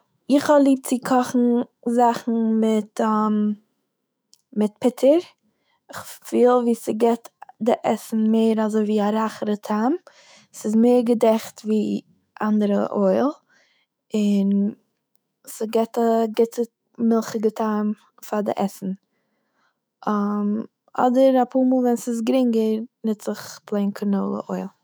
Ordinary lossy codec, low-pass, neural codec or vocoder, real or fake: none; none; autoencoder, 48 kHz, 128 numbers a frame, DAC-VAE, trained on Japanese speech; fake